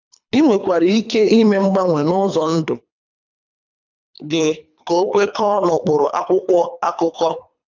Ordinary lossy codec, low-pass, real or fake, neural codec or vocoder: none; 7.2 kHz; fake; codec, 24 kHz, 3 kbps, HILCodec